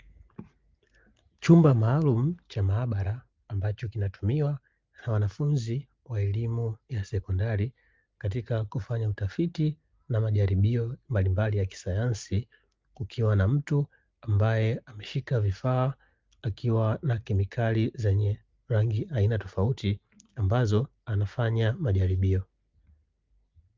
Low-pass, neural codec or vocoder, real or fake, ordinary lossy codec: 7.2 kHz; none; real; Opus, 24 kbps